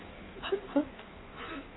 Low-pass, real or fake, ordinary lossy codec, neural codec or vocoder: 7.2 kHz; fake; AAC, 16 kbps; codec, 16 kHz in and 24 kHz out, 1.1 kbps, FireRedTTS-2 codec